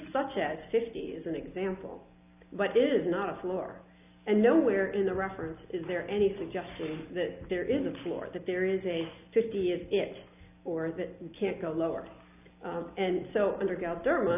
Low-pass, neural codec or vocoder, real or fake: 3.6 kHz; none; real